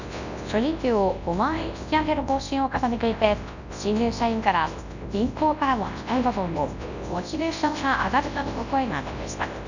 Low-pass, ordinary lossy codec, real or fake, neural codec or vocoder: 7.2 kHz; none; fake; codec, 24 kHz, 0.9 kbps, WavTokenizer, large speech release